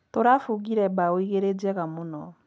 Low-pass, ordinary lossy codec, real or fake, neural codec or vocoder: none; none; real; none